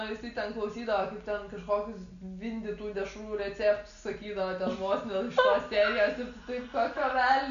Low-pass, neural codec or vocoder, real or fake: 7.2 kHz; none; real